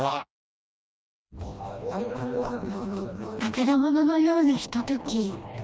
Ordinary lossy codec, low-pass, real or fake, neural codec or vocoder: none; none; fake; codec, 16 kHz, 1 kbps, FreqCodec, smaller model